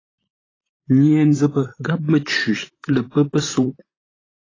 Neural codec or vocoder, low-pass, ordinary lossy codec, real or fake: vocoder, 44.1 kHz, 128 mel bands, Pupu-Vocoder; 7.2 kHz; AAC, 32 kbps; fake